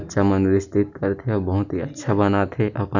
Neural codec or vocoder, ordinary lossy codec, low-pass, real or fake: none; none; 7.2 kHz; real